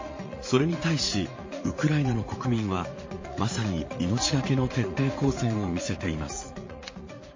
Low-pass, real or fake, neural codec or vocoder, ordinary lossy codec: 7.2 kHz; fake; vocoder, 44.1 kHz, 80 mel bands, Vocos; MP3, 32 kbps